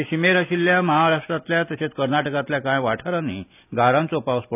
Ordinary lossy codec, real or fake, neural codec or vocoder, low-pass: none; real; none; 3.6 kHz